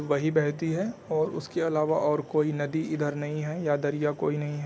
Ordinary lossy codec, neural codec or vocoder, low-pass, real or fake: none; none; none; real